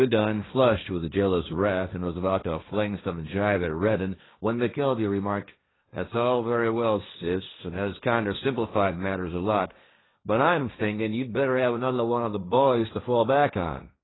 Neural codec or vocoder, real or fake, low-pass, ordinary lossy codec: codec, 16 kHz, 1.1 kbps, Voila-Tokenizer; fake; 7.2 kHz; AAC, 16 kbps